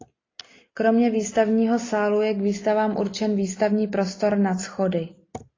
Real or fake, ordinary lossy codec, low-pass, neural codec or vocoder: real; AAC, 32 kbps; 7.2 kHz; none